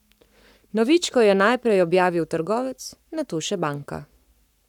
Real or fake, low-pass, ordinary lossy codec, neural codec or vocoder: fake; 19.8 kHz; none; codec, 44.1 kHz, 7.8 kbps, Pupu-Codec